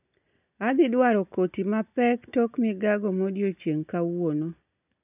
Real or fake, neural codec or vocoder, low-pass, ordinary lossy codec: real; none; 3.6 kHz; none